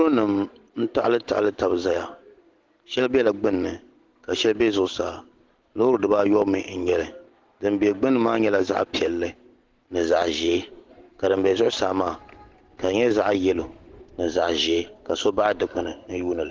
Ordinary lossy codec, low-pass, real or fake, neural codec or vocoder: Opus, 16 kbps; 7.2 kHz; real; none